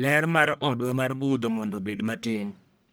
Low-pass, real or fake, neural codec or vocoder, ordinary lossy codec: none; fake; codec, 44.1 kHz, 1.7 kbps, Pupu-Codec; none